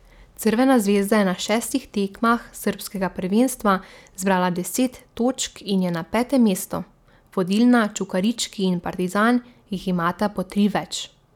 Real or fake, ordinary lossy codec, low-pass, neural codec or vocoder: real; none; 19.8 kHz; none